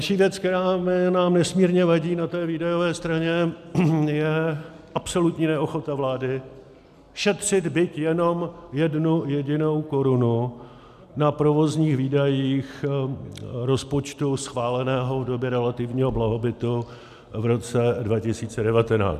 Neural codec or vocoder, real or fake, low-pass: none; real; 14.4 kHz